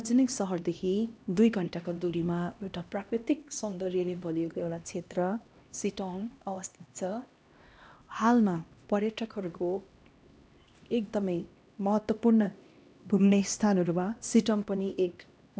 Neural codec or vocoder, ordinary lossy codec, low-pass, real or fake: codec, 16 kHz, 1 kbps, X-Codec, HuBERT features, trained on LibriSpeech; none; none; fake